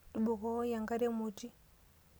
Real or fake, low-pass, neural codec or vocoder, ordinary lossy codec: real; none; none; none